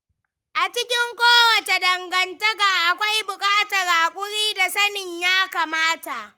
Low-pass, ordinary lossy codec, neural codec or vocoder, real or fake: 19.8 kHz; Opus, 32 kbps; codec, 44.1 kHz, 7.8 kbps, Pupu-Codec; fake